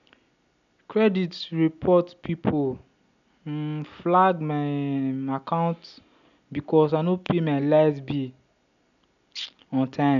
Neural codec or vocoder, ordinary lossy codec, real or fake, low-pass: none; none; real; 7.2 kHz